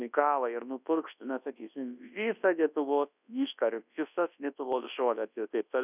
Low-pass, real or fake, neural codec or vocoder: 3.6 kHz; fake; codec, 24 kHz, 0.9 kbps, WavTokenizer, large speech release